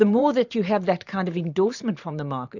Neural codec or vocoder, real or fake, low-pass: vocoder, 44.1 kHz, 128 mel bands every 512 samples, BigVGAN v2; fake; 7.2 kHz